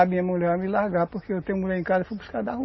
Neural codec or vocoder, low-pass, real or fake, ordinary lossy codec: none; 7.2 kHz; real; MP3, 24 kbps